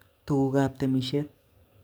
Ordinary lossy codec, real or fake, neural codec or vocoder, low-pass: none; fake; codec, 44.1 kHz, 7.8 kbps, DAC; none